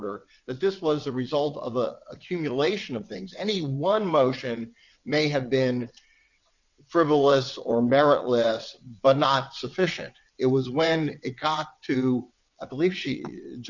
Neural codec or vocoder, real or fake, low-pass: vocoder, 22.05 kHz, 80 mel bands, WaveNeXt; fake; 7.2 kHz